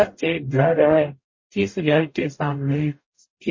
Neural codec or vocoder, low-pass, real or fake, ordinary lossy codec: codec, 44.1 kHz, 0.9 kbps, DAC; 7.2 kHz; fake; MP3, 32 kbps